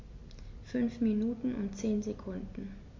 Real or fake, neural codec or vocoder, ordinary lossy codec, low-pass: real; none; MP3, 64 kbps; 7.2 kHz